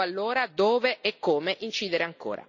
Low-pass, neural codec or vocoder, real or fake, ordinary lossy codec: 7.2 kHz; none; real; none